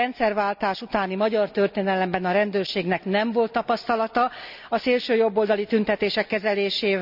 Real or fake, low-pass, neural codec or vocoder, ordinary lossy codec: real; 5.4 kHz; none; none